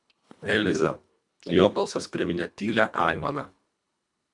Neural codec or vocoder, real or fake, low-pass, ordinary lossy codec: codec, 24 kHz, 1.5 kbps, HILCodec; fake; 10.8 kHz; MP3, 96 kbps